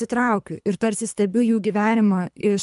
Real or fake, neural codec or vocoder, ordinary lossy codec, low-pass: fake; codec, 24 kHz, 3 kbps, HILCodec; MP3, 96 kbps; 10.8 kHz